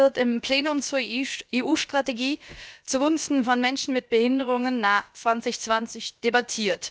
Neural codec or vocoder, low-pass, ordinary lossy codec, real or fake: codec, 16 kHz, about 1 kbps, DyCAST, with the encoder's durations; none; none; fake